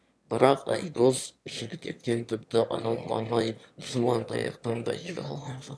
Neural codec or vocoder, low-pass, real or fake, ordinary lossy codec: autoencoder, 22.05 kHz, a latent of 192 numbers a frame, VITS, trained on one speaker; none; fake; none